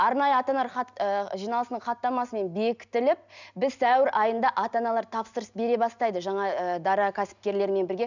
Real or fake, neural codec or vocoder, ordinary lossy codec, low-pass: real; none; none; 7.2 kHz